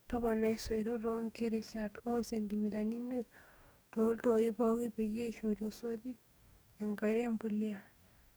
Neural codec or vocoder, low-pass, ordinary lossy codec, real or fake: codec, 44.1 kHz, 2.6 kbps, DAC; none; none; fake